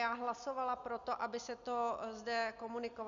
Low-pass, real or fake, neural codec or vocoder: 7.2 kHz; real; none